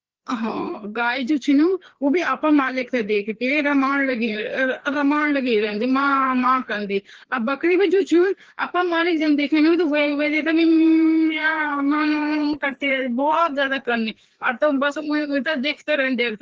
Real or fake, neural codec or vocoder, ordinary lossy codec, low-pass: fake; codec, 16 kHz, 2 kbps, FreqCodec, larger model; Opus, 16 kbps; 7.2 kHz